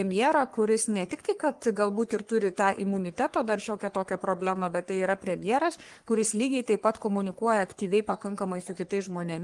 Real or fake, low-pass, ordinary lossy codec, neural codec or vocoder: fake; 10.8 kHz; Opus, 24 kbps; codec, 44.1 kHz, 3.4 kbps, Pupu-Codec